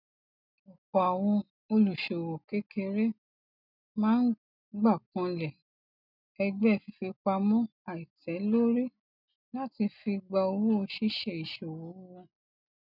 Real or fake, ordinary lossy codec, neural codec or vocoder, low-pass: real; none; none; 5.4 kHz